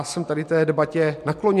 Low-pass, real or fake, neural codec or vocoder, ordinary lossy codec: 14.4 kHz; real; none; MP3, 64 kbps